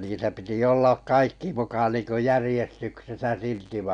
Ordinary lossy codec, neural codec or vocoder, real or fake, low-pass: none; none; real; 9.9 kHz